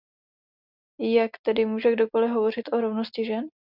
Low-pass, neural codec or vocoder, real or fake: 5.4 kHz; none; real